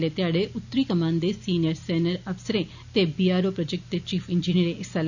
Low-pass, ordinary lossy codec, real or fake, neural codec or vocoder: 7.2 kHz; none; real; none